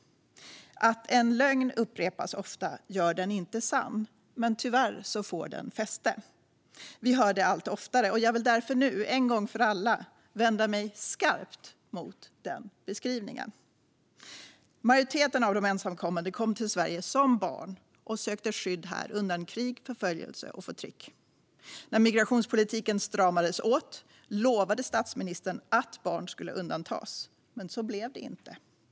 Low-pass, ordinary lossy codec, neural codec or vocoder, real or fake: none; none; none; real